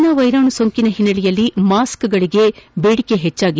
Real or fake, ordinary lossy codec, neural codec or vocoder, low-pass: real; none; none; none